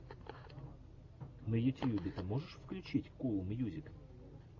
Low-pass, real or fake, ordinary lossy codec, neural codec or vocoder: 7.2 kHz; real; AAC, 48 kbps; none